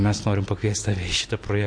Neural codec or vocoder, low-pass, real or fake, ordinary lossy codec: none; 9.9 kHz; real; MP3, 48 kbps